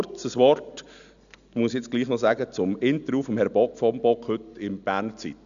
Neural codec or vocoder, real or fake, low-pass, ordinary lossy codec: none; real; 7.2 kHz; none